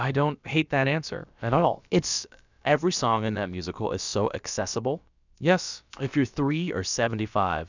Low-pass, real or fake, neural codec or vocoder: 7.2 kHz; fake; codec, 16 kHz, about 1 kbps, DyCAST, with the encoder's durations